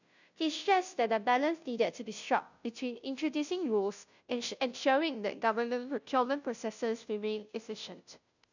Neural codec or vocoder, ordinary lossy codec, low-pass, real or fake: codec, 16 kHz, 0.5 kbps, FunCodec, trained on Chinese and English, 25 frames a second; none; 7.2 kHz; fake